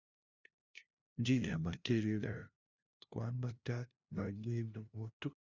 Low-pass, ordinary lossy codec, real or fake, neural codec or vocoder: 7.2 kHz; Opus, 64 kbps; fake; codec, 16 kHz, 0.5 kbps, FunCodec, trained on LibriTTS, 25 frames a second